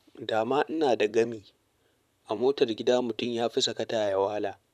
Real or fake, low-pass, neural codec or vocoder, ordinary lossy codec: fake; 14.4 kHz; vocoder, 44.1 kHz, 128 mel bands, Pupu-Vocoder; none